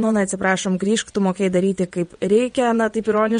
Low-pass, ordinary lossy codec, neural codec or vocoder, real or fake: 9.9 kHz; MP3, 48 kbps; vocoder, 22.05 kHz, 80 mel bands, WaveNeXt; fake